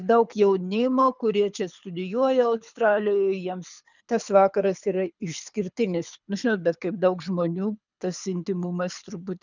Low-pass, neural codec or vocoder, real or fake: 7.2 kHz; codec, 24 kHz, 6 kbps, HILCodec; fake